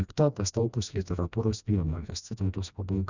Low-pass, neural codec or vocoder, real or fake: 7.2 kHz; codec, 16 kHz, 1 kbps, FreqCodec, smaller model; fake